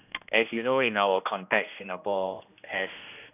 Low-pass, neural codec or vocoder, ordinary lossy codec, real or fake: 3.6 kHz; codec, 16 kHz, 1 kbps, X-Codec, HuBERT features, trained on general audio; none; fake